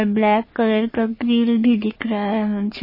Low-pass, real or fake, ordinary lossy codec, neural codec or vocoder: 5.4 kHz; fake; MP3, 24 kbps; codec, 44.1 kHz, 3.4 kbps, Pupu-Codec